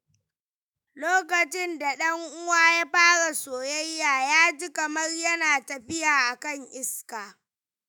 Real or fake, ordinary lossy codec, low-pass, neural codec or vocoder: fake; none; none; autoencoder, 48 kHz, 128 numbers a frame, DAC-VAE, trained on Japanese speech